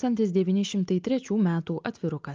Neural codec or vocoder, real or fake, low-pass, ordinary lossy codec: none; real; 7.2 kHz; Opus, 32 kbps